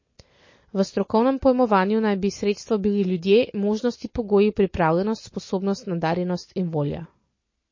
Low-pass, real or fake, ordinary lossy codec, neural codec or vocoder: 7.2 kHz; real; MP3, 32 kbps; none